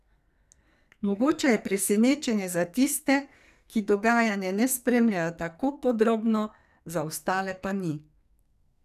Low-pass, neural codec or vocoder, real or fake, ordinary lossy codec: 14.4 kHz; codec, 44.1 kHz, 2.6 kbps, SNAC; fake; none